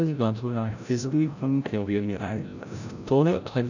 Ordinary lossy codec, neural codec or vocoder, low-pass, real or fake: none; codec, 16 kHz, 0.5 kbps, FreqCodec, larger model; 7.2 kHz; fake